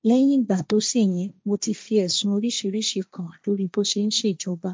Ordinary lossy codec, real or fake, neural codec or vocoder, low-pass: none; fake; codec, 16 kHz, 1.1 kbps, Voila-Tokenizer; none